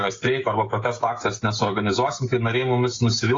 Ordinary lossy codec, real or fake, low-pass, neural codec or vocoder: AAC, 32 kbps; fake; 7.2 kHz; codec, 16 kHz, 16 kbps, FreqCodec, smaller model